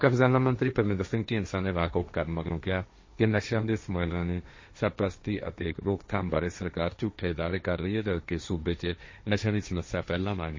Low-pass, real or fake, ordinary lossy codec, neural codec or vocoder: 7.2 kHz; fake; MP3, 32 kbps; codec, 16 kHz, 1.1 kbps, Voila-Tokenizer